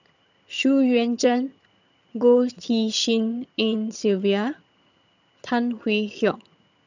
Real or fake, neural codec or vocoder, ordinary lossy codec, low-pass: fake; vocoder, 22.05 kHz, 80 mel bands, HiFi-GAN; none; 7.2 kHz